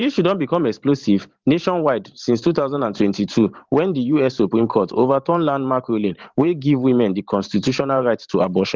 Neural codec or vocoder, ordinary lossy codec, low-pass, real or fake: vocoder, 44.1 kHz, 128 mel bands every 512 samples, BigVGAN v2; Opus, 16 kbps; 7.2 kHz; fake